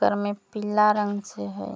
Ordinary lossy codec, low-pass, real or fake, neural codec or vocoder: none; none; real; none